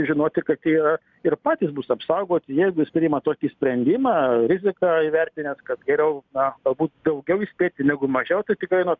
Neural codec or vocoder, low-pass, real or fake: none; 7.2 kHz; real